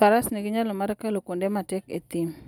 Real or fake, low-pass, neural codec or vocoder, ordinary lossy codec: real; none; none; none